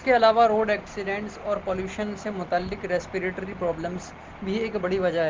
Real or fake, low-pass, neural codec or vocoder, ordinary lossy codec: real; 7.2 kHz; none; Opus, 16 kbps